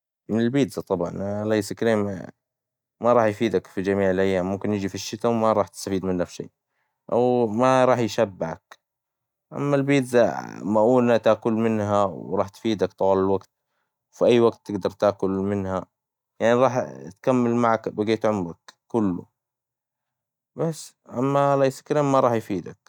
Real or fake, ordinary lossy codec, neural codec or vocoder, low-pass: real; none; none; 19.8 kHz